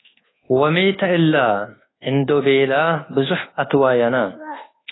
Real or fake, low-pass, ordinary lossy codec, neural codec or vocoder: fake; 7.2 kHz; AAC, 16 kbps; codec, 24 kHz, 1.2 kbps, DualCodec